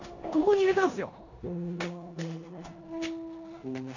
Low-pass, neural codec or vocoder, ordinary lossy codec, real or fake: 7.2 kHz; codec, 16 kHz in and 24 kHz out, 0.9 kbps, LongCat-Audio-Codec, four codebook decoder; none; fake